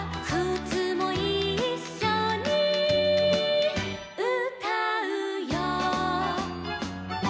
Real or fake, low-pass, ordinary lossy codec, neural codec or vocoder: real; none; none; none